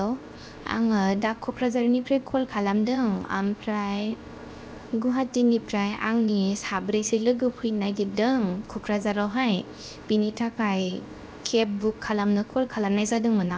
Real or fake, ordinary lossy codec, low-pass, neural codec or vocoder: fake; none; none; codec, 16 kHz, 0.7 kbps, FocalCodec